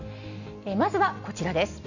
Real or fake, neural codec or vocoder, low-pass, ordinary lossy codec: real; none; 7.2 kHz; none